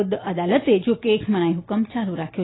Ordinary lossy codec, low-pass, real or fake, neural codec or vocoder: AAC, 16 kbps; 7.2 kHz; real; none